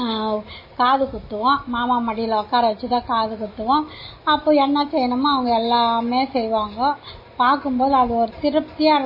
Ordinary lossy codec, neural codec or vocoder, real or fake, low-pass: MP3, 24 kbps; none; real; 5.4 kHz